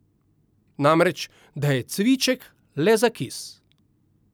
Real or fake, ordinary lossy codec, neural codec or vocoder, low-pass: real; none; none; none